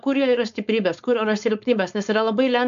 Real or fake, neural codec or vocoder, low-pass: fake; codec, 16 kHz, 4.8 kbps, FACodec; 7.2 kHz